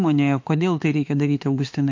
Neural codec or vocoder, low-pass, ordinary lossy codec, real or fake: autoencoder, 48 kHz, 32 numbers a frame, DAC-VAE, trained on Japanese speech; 7.2 kHz; MP3, 48 kbps; fake